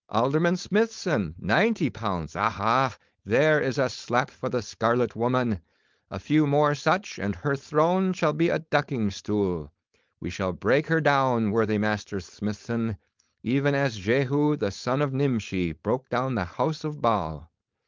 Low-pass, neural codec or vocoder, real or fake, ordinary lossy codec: 7.2 kHz; codec, 16 kHz, 4.8 kbps, FACodec; fake; Opus, 24 kbps